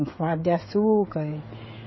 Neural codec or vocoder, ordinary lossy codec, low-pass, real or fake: codec, 16 kHz, 16 kbps, FreqCodec, smaller model; MP3, 24 kbps; 7.2 kHz; fake